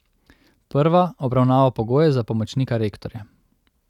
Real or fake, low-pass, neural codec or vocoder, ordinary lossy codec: real; 19.8 kHz; none; none